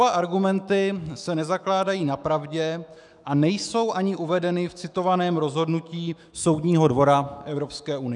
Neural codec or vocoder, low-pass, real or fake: autoencoder, 48 kHz, 128 numbers a frame, DAC-VAE, trained on Japanese speech; 10.8 kHz; fake